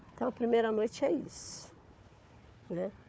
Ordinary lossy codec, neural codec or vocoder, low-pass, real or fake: none; codec, 16 kHz, 4 kbps, FunCodec, trained on Chinese and English, 50 frames a second; none; fake